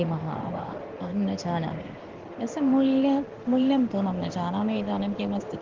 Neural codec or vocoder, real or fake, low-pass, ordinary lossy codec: codec, 16 kHz in and 24 kHz out, 1 kbps, XY-Tokenizer; fake; 7.2 kHz; Opus, 16 kbps